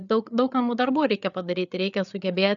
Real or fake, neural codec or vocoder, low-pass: fake; codec, 16 kHz, 8 kbps, FreqCodec, larger model; 7.2 kHz